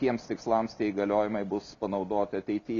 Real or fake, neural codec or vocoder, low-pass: real; none; 7.2 kHz